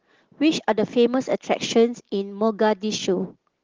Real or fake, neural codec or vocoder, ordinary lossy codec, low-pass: real; none; Opus, 16 kbps; 7.2 kHz